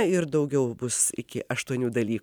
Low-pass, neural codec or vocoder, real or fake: 19.8 kHz; none; real